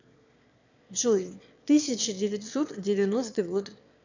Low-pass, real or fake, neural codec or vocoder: 7.2 kHz; fake; autoencoder, 22.05 kHz, a latent of 192 numbers a frame, VITS, trained on one speaker